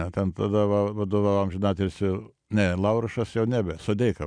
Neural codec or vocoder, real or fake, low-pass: none; real; 9.9 kHz